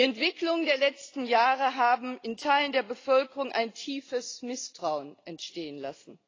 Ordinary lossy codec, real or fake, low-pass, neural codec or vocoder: AAC, 32 kbps; real; 7.2 kHz; none